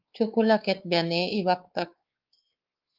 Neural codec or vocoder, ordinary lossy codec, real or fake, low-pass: codec, 16 kHz, 4 kbps, X-Codec, WavLM features, trained on Multilingual LibriSpeech; Opus, 32 kbps; fake; 5.4 kHz